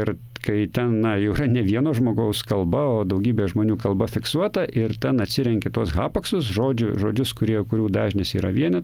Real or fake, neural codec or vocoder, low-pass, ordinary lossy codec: real; none; 19.8 kHz; Opus, 32 kbps